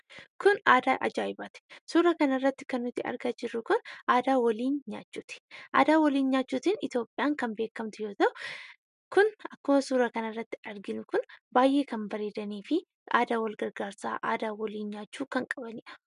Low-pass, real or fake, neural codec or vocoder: 10.8 kHz; real; none